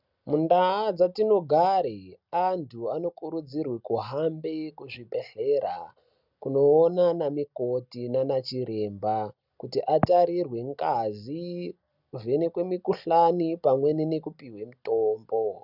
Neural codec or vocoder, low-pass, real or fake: none; 5.4 kHz; real